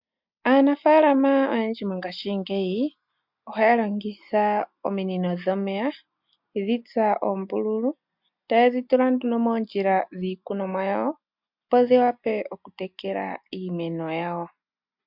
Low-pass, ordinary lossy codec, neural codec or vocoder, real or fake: 5.4 kHz; MP3, 48 kbps; none; real